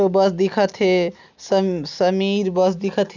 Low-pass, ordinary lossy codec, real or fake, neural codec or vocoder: 7.2 kHz; none; real; none